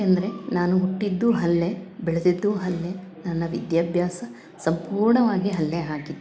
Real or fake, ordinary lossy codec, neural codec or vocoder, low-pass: real; none; none; none